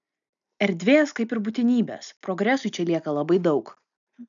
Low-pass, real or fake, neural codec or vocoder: 7.2 kHz; real; none